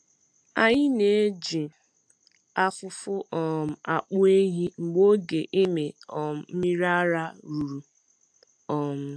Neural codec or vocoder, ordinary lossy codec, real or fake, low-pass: none; AAC, 64 kbps; real; 9.9 kHz